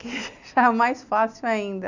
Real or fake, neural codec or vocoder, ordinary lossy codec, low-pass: real; none; none; 7.2 kHz